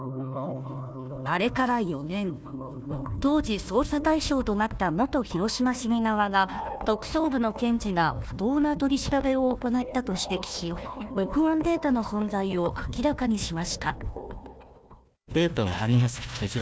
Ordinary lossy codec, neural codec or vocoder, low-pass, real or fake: none; codec, 16 kHz, 1 kbps, FunCodec, trained on Chinese and English, 50 frames a second; none; fake